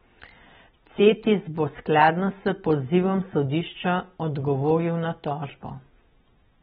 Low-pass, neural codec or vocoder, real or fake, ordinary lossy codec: 7.2 kHz; none; real; AAC, 16 kbps